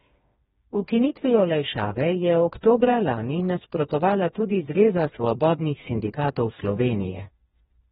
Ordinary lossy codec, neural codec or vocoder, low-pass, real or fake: AAC, 16 kbps; codec, 16 kHz, 2 kbps, FreqCodec, smaller model; 7.2 kHz; fake